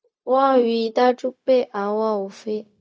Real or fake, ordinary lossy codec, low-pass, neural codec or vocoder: fake; none; none; codec, 16 kHz, 0.4 kbps, LongCat-Audio-Codec